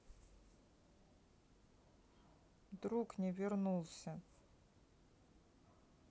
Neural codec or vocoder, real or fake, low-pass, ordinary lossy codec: none; real; none; none